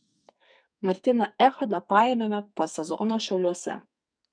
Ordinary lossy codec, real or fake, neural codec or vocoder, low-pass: MP3, 96 kbps; fake; codec, 44.1 kHz, 2.6 kbps, SNAC; 9.9 kHz